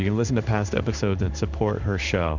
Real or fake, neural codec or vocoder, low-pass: fake; codec, 16 kHz in and 24 kHz out, 1 kbps, XY-Tokenizer; 7.2 kHz